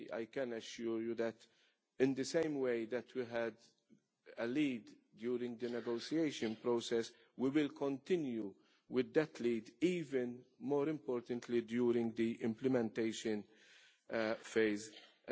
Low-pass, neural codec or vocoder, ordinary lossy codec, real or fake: none; none; none; real